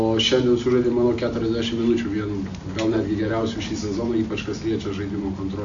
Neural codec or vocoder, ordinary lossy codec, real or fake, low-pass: none; MP3, 64 kbps; real; 7.2 kHz